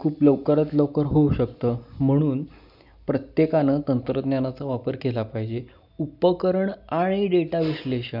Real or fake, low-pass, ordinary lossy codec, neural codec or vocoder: real; 5.4 kHz; none; none